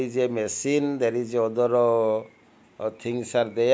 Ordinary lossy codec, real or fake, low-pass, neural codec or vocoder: none; real; none; none